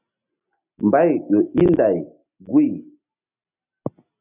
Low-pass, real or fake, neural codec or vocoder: 3.6 kHz; real; none